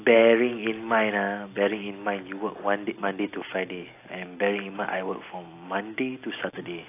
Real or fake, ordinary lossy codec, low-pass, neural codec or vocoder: real; AAC, 24 kbps; 3.6 kHz; none